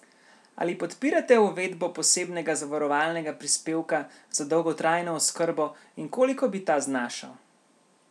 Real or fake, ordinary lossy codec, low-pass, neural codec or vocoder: real; none; none; none